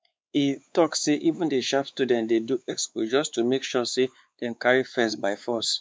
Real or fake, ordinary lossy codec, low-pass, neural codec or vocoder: fake; none; none; codec, 16 kHz, 4 kbps, X-Codec, WavLM features, trained on Multilingual LibriSpeech